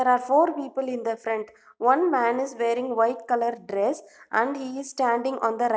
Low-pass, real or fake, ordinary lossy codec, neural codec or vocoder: none; real; none; none